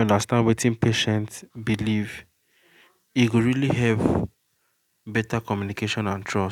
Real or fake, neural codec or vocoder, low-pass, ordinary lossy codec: real; none; 19.8 kHz; none